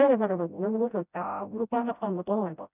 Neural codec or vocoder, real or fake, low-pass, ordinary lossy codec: codec, 16 kHz, 0.5 kbps, FreqCodec, smaller model; fake; 3.6 kHz; none